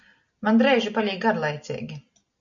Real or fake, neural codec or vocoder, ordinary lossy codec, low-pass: real; none; MP3, 48 kbps; 7.2 kHz